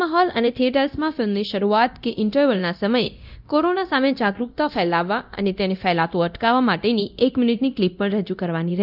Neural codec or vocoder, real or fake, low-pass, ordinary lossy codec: codec, 24 kHz, 0.9 kbps, DualCodec; fake; 5.4 kHz; none